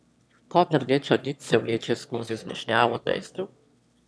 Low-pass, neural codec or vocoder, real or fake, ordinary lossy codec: none; autoencoder, 22.05 kHz, a latent of 192 numbers a frame, VITS, trained on one speaker; fake; none